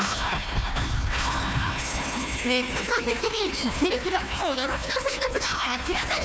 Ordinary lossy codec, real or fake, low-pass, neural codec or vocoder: none; fake; none; codec, 16 kHz, 1 kbps, FunCodec, trained on Chinese and English, 50 frames a second